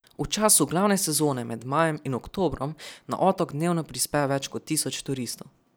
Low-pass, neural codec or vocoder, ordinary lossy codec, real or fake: none; none; none; real